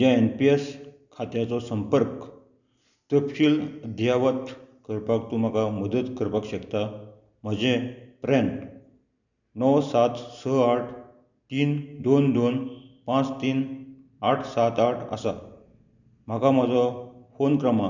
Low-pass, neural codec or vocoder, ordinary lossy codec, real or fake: 7.2 kHz; none; none; real